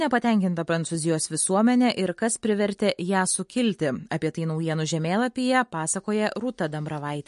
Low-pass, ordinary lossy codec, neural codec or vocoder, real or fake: 14.4 kHz; MP3, 48 kbps; none; real